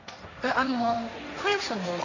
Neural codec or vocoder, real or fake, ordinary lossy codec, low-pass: codec, 16 kHz, 1.1 kbps, Voila-Tokenizer; fake; none; 7.2 kHz